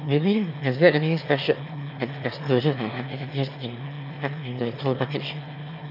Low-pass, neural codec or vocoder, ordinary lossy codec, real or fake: 5.4 kHz; autoencoder, 22.05 kHz, a latent of 192 numbers a frame, VITS, trained on one speaker; none; fake